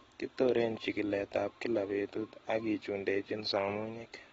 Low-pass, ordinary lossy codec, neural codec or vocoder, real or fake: 19.8 kHz; AAC, 24 kbps; none; real